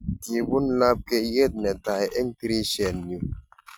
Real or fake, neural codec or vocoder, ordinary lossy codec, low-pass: real; none; none; none